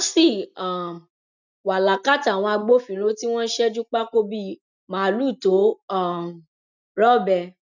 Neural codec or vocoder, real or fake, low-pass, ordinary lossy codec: none; real; 7.2 kHz; none